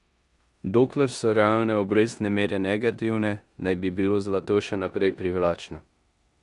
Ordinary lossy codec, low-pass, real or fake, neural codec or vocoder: none; 10.8 kHz; fake; codec, 16 kHz in and 24 kHz out, 0.9 kbps, LongCat-Audio-Codec, four codebook decoder